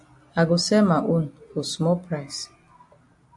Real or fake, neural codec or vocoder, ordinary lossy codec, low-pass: real; none; AAC, 64 kbps; 10.8 kHz